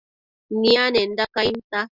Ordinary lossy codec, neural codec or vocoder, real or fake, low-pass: Opus, 64 kbps; none; real; 5.4 kHz